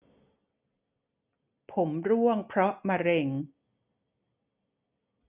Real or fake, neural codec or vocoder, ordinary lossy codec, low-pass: real; none; none; 3.6 kHz